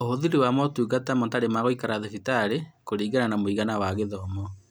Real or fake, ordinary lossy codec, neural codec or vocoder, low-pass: real; none; none; none